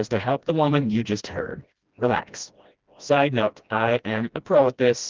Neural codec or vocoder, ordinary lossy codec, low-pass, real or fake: codec, 16 kHz, 1 kbps, FreqCodec, smaller model; Opus, 32 kbps; 7.2 kHz; fake